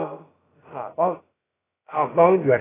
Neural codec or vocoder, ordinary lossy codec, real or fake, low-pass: codec, 16 kHz, about 1 kbps, DyCAST, with the encoder's durations; AAC, 16 kbps; fake; 3.6 kHz